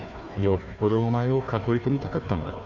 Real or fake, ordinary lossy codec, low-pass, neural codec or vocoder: fake; none; 7.2 kHz; codec, 16 kHz, 1 kbps, FunCodec, trained on Chinese and English, 50 frames a second